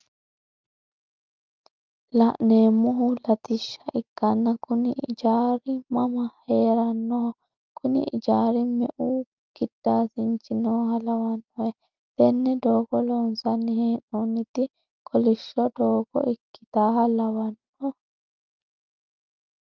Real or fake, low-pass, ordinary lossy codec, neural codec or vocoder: real; 7.2 kHz; Opus, 32 kbps; none